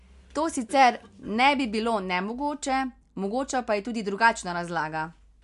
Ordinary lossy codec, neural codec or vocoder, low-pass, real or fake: MP3, 64 kbps; none; 10.8 kHz; real